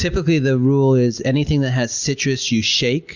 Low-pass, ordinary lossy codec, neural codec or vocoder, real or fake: 7.2 kHz; Opus, 64 kbps; none; real